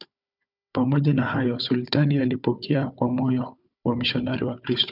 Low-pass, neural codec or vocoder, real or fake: 5.4 kHz; codec, 16 kHz, 4 kbps, FunCodec, trained on Chinese and English, 50 frames a second; fake